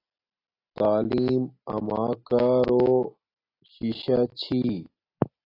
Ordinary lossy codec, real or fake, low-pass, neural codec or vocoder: AAC, 32 kbps; real; 5.4 kHz; none